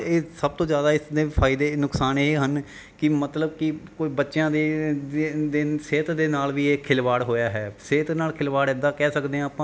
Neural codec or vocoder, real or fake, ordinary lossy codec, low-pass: none; real; none; none